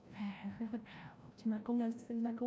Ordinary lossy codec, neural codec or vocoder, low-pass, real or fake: none; codec, 16 kHz, 0.5 kbps, FreqCodec, larger model; none; fake